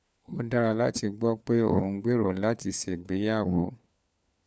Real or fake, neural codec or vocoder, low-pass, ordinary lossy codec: fake; codec, 16 kHz, 4 kbps, FunCodec, trained on LibriTTS, 50 frames a second; none; none